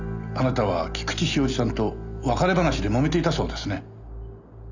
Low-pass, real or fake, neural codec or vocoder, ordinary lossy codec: 7.2 kHz; real; none; none